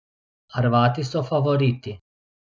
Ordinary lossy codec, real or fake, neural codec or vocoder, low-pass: none; real; none; none